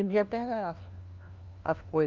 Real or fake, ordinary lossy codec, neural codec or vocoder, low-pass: fake; Opus, 24 kbps; codec, 16 kHz, 1 kbps, FunCodec, trained on LibriTTS, 50 frames a second; 7.2 kHz